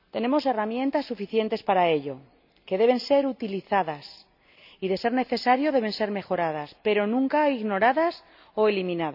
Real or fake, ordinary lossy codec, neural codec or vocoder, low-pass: real; none; none; 5.4 kHz